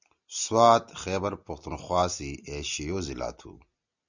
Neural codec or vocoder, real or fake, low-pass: none; real; 7.2 kHz